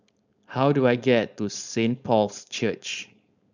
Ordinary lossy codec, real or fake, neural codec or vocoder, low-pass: none; fake; codec, 16 kHz, 4.8 kbps, FACodec; 7.2 kHz